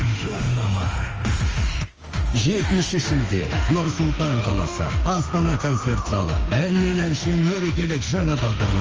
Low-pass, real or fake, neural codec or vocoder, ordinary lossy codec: 7.2 kHz; fake; autoencoder, 48 kHz, 32 numbers a frame, DAC-VAE, trained on Japanese speech; Opus, 24 kbps